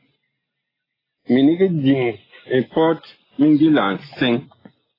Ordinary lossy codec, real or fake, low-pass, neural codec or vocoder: AAC, 24 kbps; real; 5.4 kHz; none